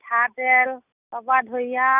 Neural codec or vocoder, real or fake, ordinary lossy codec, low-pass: none; real; none; 3.6 kHz